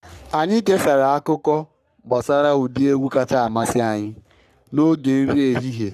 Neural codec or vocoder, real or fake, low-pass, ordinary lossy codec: codec, 44.1 kHz, 3.4 kbps, Pupu-Codec; fake; 14.4 kHz; none